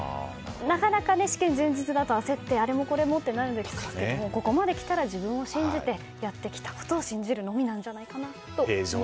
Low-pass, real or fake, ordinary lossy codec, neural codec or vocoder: none; real; none; none